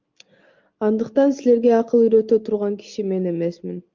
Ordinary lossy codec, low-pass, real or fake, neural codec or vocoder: Opus, 24 kbps; 7.2 kHz; real; none